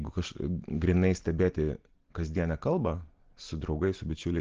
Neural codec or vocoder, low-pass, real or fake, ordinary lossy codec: none; 7.2 kHz; real; Opus, 16 kbps